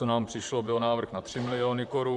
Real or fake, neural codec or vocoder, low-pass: fake; vocoder, 44.1 kHz, 128 mel bands, Pupu-Vocoder; 10.8 kHz